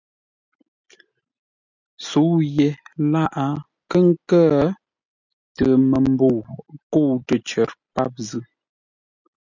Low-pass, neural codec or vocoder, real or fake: 7.2 kHz; none; real